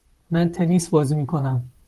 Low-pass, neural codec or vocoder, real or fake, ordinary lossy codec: 14.4 kHz; vocoder, 44.1 kHz, 128 mel bands, Pupu-Vocoder; fake; Opus, 32 kbps